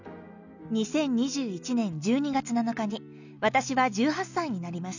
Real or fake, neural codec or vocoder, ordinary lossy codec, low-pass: real; none; none; 7.2 kHz